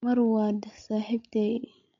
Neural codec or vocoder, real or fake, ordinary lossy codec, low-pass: codec, 16 kHz, 16 kbps, FreqCodec, larger model; fake; MP3, 48 kbps; 7.2 kHz